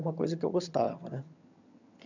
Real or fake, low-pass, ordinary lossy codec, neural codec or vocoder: fake; 7.2 kHz; none; vocoder, 22.05 kHz, 80 mel bands, HiFi-GAN